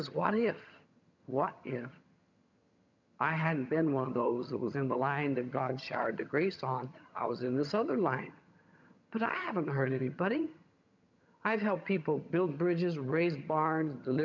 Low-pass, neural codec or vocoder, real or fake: 7.2 kHz; vocoder, 22.05 kHz, 80 mel bands, HiFi-GAN; fake